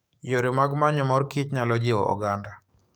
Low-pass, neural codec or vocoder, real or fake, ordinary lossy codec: none; codec, 44.1 kHz, 7.8 kbps, DAC; fake; none